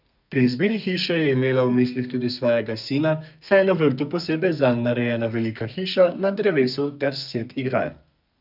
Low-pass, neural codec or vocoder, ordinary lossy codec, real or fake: 5.4 kHz; codec, 32 kHz, 1.9 kbps, SNAC; none; fake